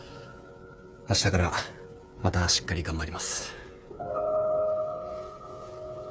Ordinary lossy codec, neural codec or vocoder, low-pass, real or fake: none; codec, 16 kHz, 8 kbps, FreqCodec, smaller model; none; fake